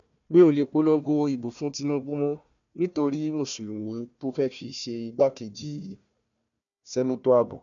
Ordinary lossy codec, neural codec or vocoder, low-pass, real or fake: none; codec, 16 kHz, 1 kbps, FunCodec, trained on Chinese and English, 50 frames a second; 7.2 kHz; fake